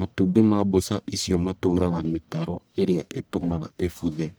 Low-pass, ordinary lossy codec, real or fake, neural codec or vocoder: none; none; fake; codec, 44.1 kHz, 1.7 kbps, Pupu-Codec